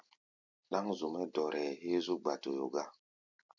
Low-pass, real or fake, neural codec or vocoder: 7.2 kHz; real; none